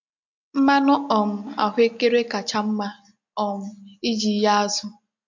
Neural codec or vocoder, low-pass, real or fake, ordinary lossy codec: none; 7.2 kHz; real; MP3, 48 kbps